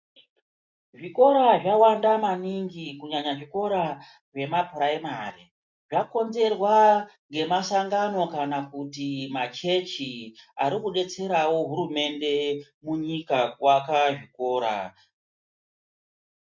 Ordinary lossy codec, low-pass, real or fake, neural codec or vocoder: MP3, 64 kbps; 7.2 kHz; real; none